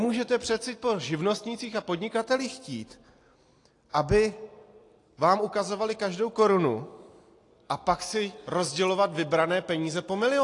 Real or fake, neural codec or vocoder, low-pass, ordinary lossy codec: real; none; 10.8 kHz; AAC, 48 kbps